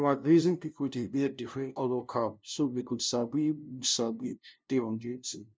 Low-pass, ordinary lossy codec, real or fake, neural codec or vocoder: none; none; fake; codec, 16 kHz, 0.5 kbps, FunCodec, trained on LibriTTS, 25 frames a second